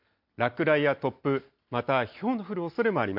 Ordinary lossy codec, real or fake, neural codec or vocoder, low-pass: MP3, 48 kbps; real; none; 5.4 kHz